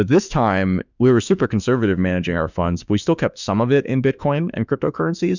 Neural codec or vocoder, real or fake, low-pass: autoencoder, 48 kHz, 32 numbers a frame, DAC-VAE, trained on Japanese speech; fake; 7.2 kHz